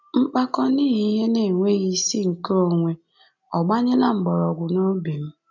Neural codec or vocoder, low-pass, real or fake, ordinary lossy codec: none; 7.2 kHz; real; none